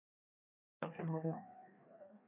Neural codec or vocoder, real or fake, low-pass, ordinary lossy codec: codec, 16 kHz, 2 kbps, FreqCodec, larger model; fake; 3.6 kHz; AAC, 32 kbps